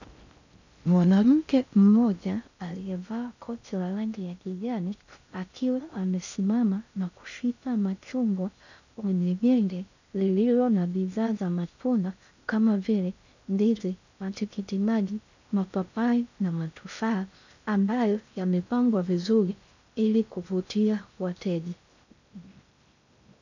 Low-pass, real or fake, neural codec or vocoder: 7.2 kHz; fake; codec, 16 kHz in and 24 kHz out, 0.6 kbps, FocalCodec, streaming, 2048 codes